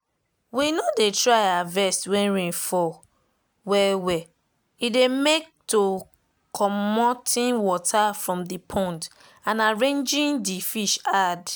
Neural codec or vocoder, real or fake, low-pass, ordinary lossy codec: none; real; none; none